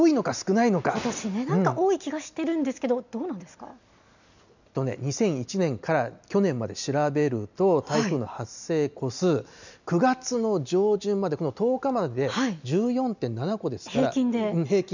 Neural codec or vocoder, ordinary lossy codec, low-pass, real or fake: none; none; 7.2 kHz; real